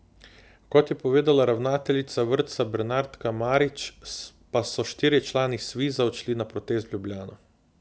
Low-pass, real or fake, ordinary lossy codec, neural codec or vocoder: none; real; none; none